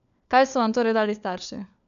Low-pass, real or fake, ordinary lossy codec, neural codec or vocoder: 7.2 kHz; fake; none; codec, 16 kHz, 4 kbps, FunCodec, trained on LibriTTS, 50 frames a second